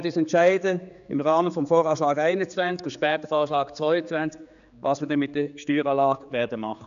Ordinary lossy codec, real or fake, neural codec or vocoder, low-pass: none; fake; codec, 16 kHz, 4 kbps, X-Codec, HuBERT features, trained on general audio; 7.2 kHz